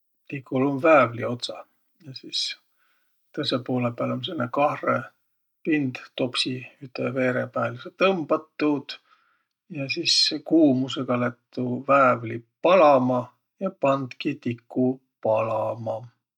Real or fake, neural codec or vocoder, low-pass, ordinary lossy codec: real; none; 19.8 kHz; none